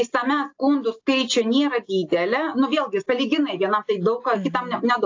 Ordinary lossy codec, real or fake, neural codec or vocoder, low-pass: AAC, 48 kbps; real; none; 7.2 kHz